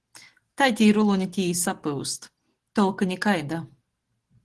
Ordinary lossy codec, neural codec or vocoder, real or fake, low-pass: Opus, 16 kbps; none; real; 10.8 kHz